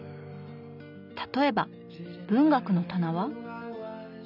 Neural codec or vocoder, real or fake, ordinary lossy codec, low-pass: none; real; none; 5.4 kHz